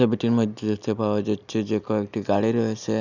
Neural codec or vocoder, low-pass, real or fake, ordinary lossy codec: none; 7.2 kHz; real; none